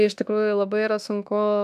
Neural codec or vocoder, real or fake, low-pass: autoencoder, 48 kHz, 32 numbers a frame, DAC-VAE, trained on Japanese speech; fake; 14.4 kHz